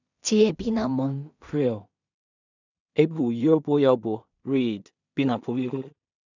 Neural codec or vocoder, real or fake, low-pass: codec, 16 kHz in and 24 kHz out, 0.4 kbps, LongCat-Audio-Codec, two codebook decoder; fake; 7.2 kHz